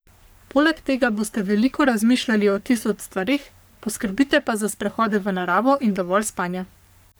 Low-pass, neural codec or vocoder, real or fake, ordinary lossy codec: none; codec, 44.1 kHz, 3.4 kbps, Pupu-Codec; fake; none